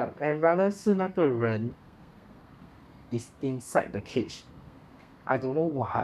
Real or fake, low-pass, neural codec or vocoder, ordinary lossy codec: fake; 14.4 kHz; codec, 32 kHz, 1.9 kbps, SNAC; none